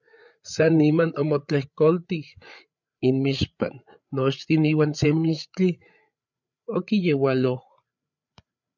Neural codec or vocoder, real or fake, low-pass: codec, 16 kHz, 8 kbps, FreqCodec, larger model; fake; 7.2 kHz